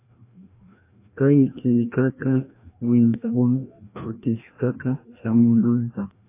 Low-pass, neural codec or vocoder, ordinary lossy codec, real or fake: 3.6 kHz; codec, 16 kHz, 1 kbps, FreqCodec, larger model; AAC, 24 kbps; fake